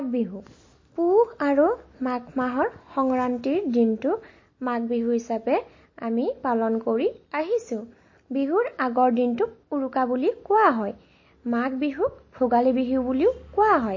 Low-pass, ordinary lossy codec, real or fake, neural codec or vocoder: 7.2 kHz; MP3, 32 kbps; real; none